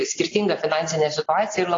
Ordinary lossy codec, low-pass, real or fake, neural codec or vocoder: AAC, 32 kbps; 7.2 kHz; real; none